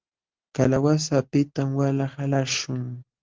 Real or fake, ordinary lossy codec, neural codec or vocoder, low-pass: real; Opus, 16 kbps; none; 7.2 kHz